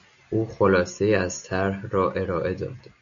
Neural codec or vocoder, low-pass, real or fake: none; 7.2 kHz; real